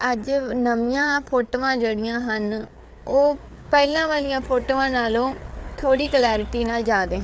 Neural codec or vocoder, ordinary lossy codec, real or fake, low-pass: codec, 16 kHz, 4 kbps, FreqCodec, larger model; none; fake; none